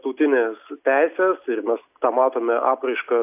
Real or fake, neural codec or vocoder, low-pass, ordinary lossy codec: real; none; 3.6 kHz; AAC, 32 kbps